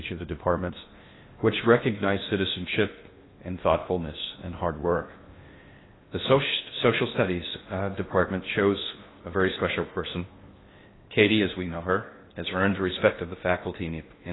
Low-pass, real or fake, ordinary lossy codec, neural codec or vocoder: 7.2 kHz; fake; AAC, 16 kbps; codec, 16 kHz in and 24 kHz out, 0.6 kbps, FocalCodec, streaming, 2048 codes